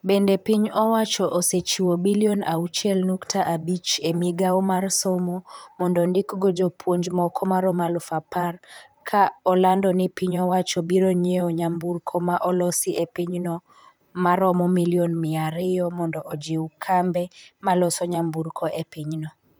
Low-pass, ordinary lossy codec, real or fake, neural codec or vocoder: none; none; fake; vocoder, 44.1 kHz, 128 mel bands, Pupu-Vocoder